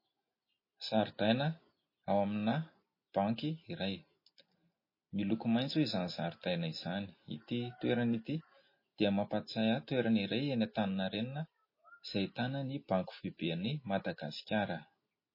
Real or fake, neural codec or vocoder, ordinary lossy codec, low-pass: real; none; MP3, 24 kbps; 5.4 kHz